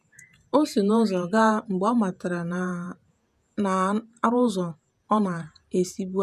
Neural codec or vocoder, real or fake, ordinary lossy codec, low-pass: vocoder, 48 kHz, 128 mel bands, Vocos; fake; none; 14.4 kHz